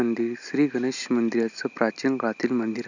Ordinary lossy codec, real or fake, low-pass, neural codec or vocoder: none; real; 7.2 kHz; none